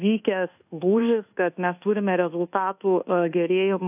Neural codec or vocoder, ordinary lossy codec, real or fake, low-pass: codec, 24 kHz, 1.2 kbps, DualCodec; AAC, 32 kbps; fake; 3.6 kHz